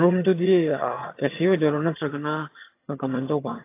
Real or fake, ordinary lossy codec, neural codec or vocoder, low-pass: fake; AAC, 24 kbps; vocoder, 22.05 kHz, 80 mel bands, HiFi-GAN; 3.6 kHz